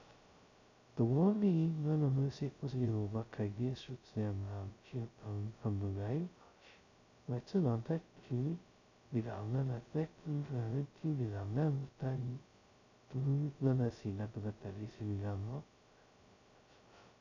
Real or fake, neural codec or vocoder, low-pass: fake; codec, 16 kHz, 0.2 kbps, FocalCodec; 7.2 kHz